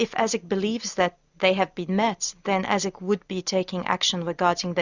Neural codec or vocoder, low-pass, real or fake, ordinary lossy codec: none; 7.2 kHz; real; Opus, 64 kbps